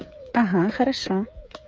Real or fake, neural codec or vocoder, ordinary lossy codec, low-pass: fake; codec, 16 kHz, 8 kbps, FreqCodec, smaller model; none; none